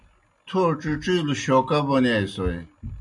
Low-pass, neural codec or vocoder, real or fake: 10.8 kHz; none; real